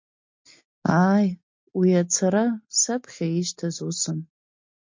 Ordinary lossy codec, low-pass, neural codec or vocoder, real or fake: MP3, 48 kbps; 7.2 kHz; none; real